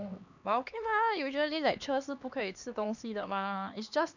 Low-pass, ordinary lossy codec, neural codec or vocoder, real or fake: 7.2 kHz; none; codec, 16 kHz, 2 kbps, X-Codec, HuBERT features, trained on LibriSpeech; fake